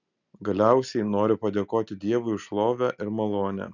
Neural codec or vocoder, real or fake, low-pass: none; real; 7.2 kHz